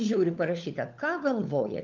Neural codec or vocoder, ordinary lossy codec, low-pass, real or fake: codec, 16 kHz, 4 kbps, FunCodec, trained on LibriTTS, 50 frames a second; Opus, 24 kbps; 7.2 kHz; fake